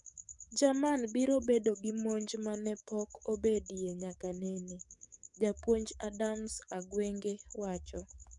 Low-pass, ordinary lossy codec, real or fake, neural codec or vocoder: 10.8 kHz; none; fake; codec, 44.1 kHz, 7.8 kbps, DAC